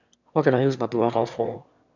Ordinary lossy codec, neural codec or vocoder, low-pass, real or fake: none; autoencoder, 22.05 kHz, a latent of 192 numbers a frame, VITS, trained on one speaker; 7.2 kHz; fake